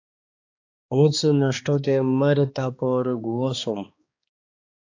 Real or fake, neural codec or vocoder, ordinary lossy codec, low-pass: fake; codec, 16 kHz, 2 kbps, X-Codec, HuBERT features, trained on balanced general audio; AAC, 48 kbps; 7.2 kHz